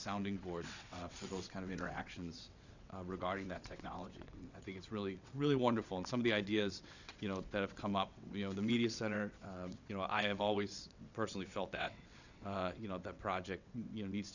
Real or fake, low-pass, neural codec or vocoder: fake; 7.2 kHz; vocoder, 22.05 kHz, 80 mel bands, WaveNeXt